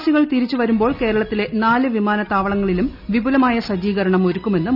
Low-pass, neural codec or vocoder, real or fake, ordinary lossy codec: 5.4 kHz; none; real; none